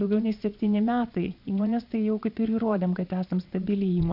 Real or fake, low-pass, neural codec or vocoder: fake; 5.4 kHz; vocoder, 22.05 kHz, 80 mel bands, Vocos